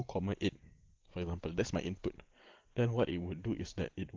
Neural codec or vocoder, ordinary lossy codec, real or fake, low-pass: none; Opus, 16 kbps; real; 7.2 kHz